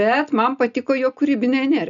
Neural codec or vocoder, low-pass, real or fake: none; 7.2 kHz; real